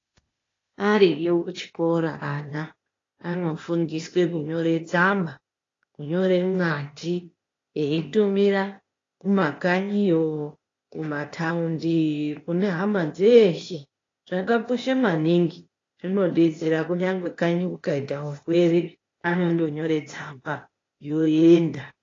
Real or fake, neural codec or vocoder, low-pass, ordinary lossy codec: fake; codec, 16 kHz, 0.8 kbps, ZipCodec; 7.2 kHz; AAC, 32 kbps